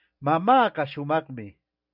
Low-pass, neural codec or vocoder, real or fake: 5.4 kHz; none; real